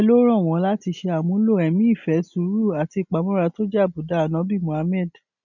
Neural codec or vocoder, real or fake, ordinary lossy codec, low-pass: none; real; none; 7.2 kHz